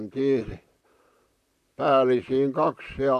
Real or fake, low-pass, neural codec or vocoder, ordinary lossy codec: fake; 14.4 kHz; vocoder, 44.1 kHz, 128 mel bands, Pupu-Vocoder; AAC, 96 kbps